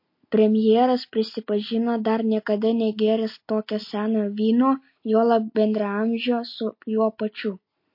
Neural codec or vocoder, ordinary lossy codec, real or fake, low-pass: none; MP3, 32 kbps; real; 5.4 kHz